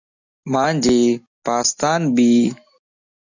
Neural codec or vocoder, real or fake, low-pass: none; real; 7.2 kHz